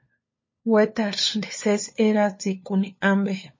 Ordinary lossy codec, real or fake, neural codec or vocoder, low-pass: MP3, 32 kbps; fake; codec, 16 kHz, 4 kbps, FunCodec, trained on LibriTTS, 50 frames a second; 7.2 kHz